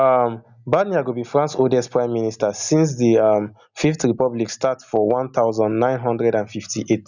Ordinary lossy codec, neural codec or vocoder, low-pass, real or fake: none; none; 7.2 kHz; real